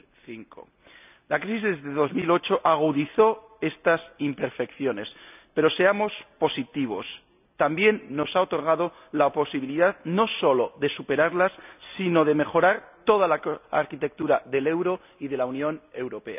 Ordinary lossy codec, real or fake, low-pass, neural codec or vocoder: none; real; 3.6 kHz; none